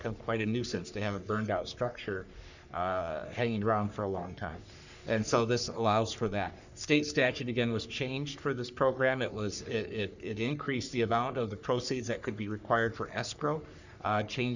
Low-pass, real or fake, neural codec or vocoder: 7.2 kHz; fake; codec, 44.1 kHz, 3.4 kbps, Pupu-Codec